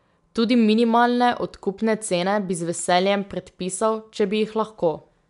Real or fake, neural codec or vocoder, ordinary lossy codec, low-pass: real; none; none; 10.8 kHz